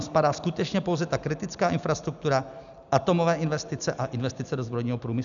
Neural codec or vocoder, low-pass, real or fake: none; 7.2 kHz; real